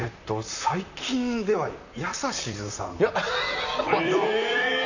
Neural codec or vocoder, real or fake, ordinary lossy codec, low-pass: vocoder, 44.1 kHz, 128 mel bands, Pupu-Vocoder; fake; none; 7.2 kHz